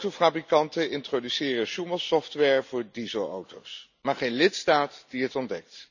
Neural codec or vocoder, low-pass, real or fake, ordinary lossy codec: none; 7.2 kHz; real; none